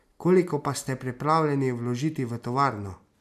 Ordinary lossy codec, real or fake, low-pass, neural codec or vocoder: MP3, 96 kbps; real; 14.4 kHz; none